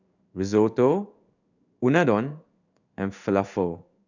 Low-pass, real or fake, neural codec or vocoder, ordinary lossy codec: 7.2 kHz; fake; codec, 16 kHz in and 24 kHz out, 1 kbps, XY-Tokenizer; none